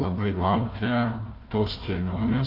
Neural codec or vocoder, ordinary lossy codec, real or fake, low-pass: codec, 16 kHz, 1 kbps, FunCodec, trained on Chinese and English, 50 frames a second; Opus, 32 kbps; fake; 5.4 kHz